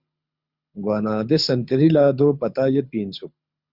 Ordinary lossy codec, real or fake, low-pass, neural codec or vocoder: Opus, 64 kbps; fake; 5.4 kHz; codec, 24 kHz, 6 kbps, HILCodec